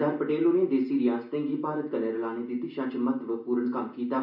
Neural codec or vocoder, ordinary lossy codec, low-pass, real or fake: none; none; 5.4 kHz; real